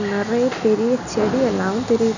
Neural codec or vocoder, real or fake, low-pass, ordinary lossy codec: none; real; 7.2 kHz; none